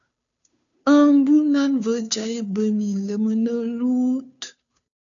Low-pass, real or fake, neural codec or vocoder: 7.2 kHz; fake; codec, 16 kHz, 2 kbps, FunCodec, trained on Chinese and English, 25 frames a second